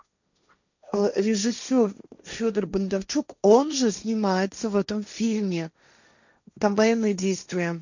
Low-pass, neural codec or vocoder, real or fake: 7.2 kHz; codec, 16 kHz, 1.1 kbps, Voila-Tokenizer; fake